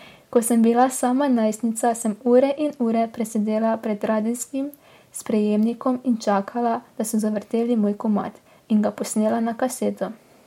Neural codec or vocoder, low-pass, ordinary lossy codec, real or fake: none; 19.8 kHz; MP3, 64 kbps; real